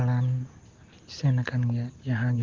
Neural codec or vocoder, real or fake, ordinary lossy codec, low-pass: none; real; Opus, 16 kbps; 7.2 kHz